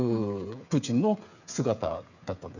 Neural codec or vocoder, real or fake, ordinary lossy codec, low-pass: codec, 16 kHz, 8 kbps, FreqCodec, smaller model; fake; none; 7.2 kHz